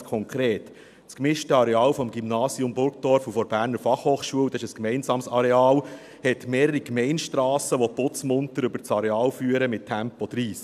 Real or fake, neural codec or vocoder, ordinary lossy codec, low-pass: real; none; none; 14.4 kHz